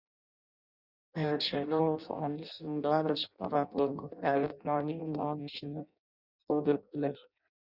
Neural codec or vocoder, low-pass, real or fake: codec, 16 kHz in and 24 kHz out, 0.6 kbps, FireRedTTS-2 codec; 5.4 kHz; fake